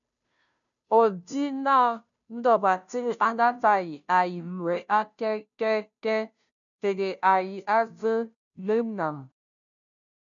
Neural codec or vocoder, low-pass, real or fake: codec, 16 kHz, 0.5 kbps, FunCodec, trained on Chinese and English, 25 frames a second; 7.2 kHz; fake